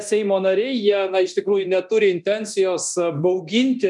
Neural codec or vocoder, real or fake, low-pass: codec, 24 kHz, 0.9 kbps, DualCodec; fake; 10.8 kHz